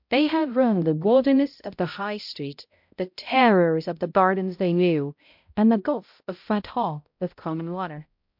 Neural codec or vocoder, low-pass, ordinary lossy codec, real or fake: codec, 16 kHz, 0.5 kbps, X-Codec, HuBERT features, trained on balanced general audio; 5.4 kHz; MP3, 48 kbps; fake